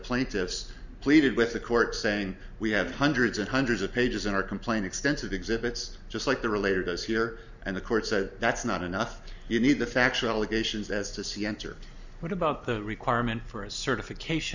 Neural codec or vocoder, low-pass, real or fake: none; 7.2 kHz; real